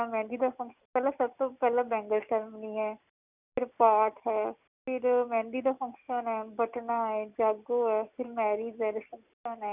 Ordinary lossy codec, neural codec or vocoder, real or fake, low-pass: none; none; real; 3.6 kHz